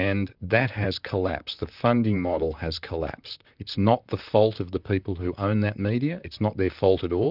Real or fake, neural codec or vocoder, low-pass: fake; vocoder, 44.1 kHz, 128 mel bands, Pupu-Vocoder; 5.4 kHz